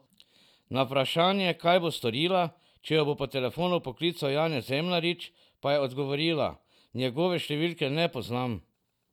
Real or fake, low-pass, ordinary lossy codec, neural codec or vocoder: real; 19.8 kHz; none; none